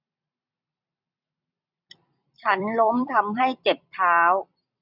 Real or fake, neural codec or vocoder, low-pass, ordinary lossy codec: fake; vocoder, 44.1 kHz, 128 mel bands every 256 samples, BigVGAN v2; 5.4 kHz; none